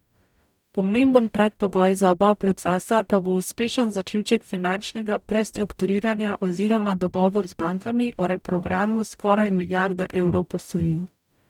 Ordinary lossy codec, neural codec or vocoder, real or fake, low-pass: none; codec, 44.1 kHz, 0.9 kbps, DAC; fake; 19.8 kHz